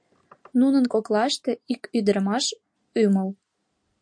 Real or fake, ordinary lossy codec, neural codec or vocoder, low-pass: real; MP3, 48 kbps; none; 9.9 kHz